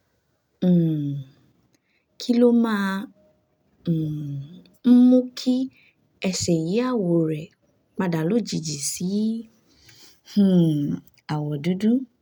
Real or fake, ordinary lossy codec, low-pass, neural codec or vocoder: real; none; 19.8 kHz; none